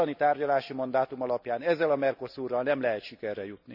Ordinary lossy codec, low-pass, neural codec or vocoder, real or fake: none; 5.4 kHz; none; real